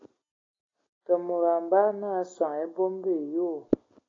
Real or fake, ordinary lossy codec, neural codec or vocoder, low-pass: real; AAC, 32 kbps; none; 7.2 kHz